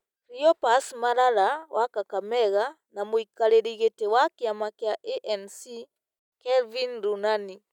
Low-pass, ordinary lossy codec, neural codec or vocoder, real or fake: 19.8 kHz; none; none; real